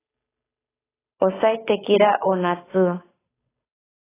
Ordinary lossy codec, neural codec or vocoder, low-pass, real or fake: AAC, 16 kbps; codec, 16 kHz, 8 kbps, FunCodec, trained on Chinese and English, 25 frames a second; 3.6 kHz; fake